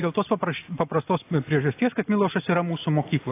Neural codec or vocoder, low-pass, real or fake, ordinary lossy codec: none; 3.6 kHz; real; AAC, 24 kbps